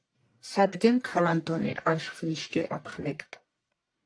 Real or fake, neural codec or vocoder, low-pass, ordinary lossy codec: fake; codec, 44.1 kHz, 1.7 kbps, Pupu-Codec; 9.9 kHz; AAC, 48 kbps